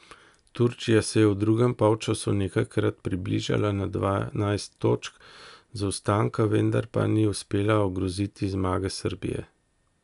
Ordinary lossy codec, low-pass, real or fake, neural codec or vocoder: none; 10.8 kHz; real; none